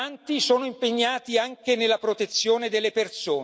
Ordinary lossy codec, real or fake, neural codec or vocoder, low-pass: none; real; none; none